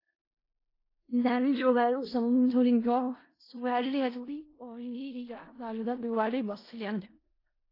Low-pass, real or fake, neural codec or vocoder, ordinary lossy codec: 5.4 kHz; fake; codec, 16 kHz in and 24 kHz out, 0.4 kbps, LongCat-Audio-Codec, four codebook decoder; AAC, 24 kbps